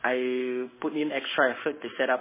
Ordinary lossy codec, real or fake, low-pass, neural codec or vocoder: MP3, 16 kbps; fake; 3.6 kHz; codec, 16 kHz in and 24 kHz out, 1 kbps, XY-Tokenizer